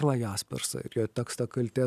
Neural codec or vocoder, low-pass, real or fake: none; 14.4 kHz; real